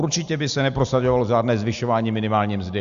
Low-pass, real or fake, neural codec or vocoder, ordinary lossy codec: 7.2 kHz; real; none; Opus, 64 kbps